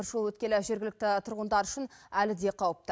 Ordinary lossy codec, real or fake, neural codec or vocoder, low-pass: none; real; none; none